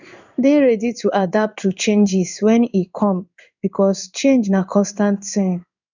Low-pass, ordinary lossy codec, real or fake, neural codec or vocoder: 7.2 kHz; none; fake; codec, 16 kHz in and 24 kHz out, 1 kbps, XY-Tokenizer